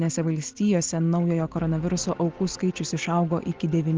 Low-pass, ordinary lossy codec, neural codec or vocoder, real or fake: 7.2 kHz; Opus, 16 kbps; none; real